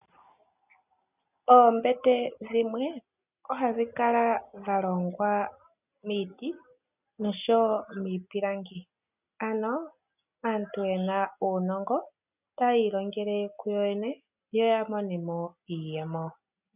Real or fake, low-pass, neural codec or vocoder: real; 3.6 kHz; none